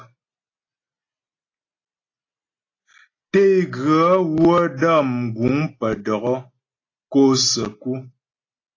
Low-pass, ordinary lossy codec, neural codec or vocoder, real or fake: 7.2 kHz; AAC, 32 kbps; none; real